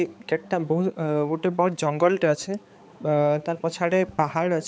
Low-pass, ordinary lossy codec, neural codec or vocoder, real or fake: none; none; codec, 16 kHz, 4 kbps, X-Codec, HuBERT features, trained on balanced general audio; fake